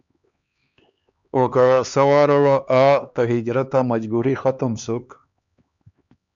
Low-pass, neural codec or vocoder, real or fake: 7.2 kHz; codec, 16 kHz, 2 kbps, X-Codec, HuBERT features, trained on LibriSpeech; fake